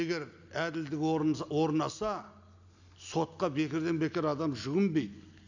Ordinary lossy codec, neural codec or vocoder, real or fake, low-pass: none; none; real; 7.2 kHz